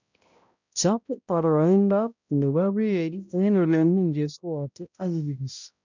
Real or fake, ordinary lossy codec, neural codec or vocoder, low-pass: fake; none; codec, 16 kHz, 0.5 kbps, X-Codec, HuBERT features, trained on balanced general audio; 7.2 kHz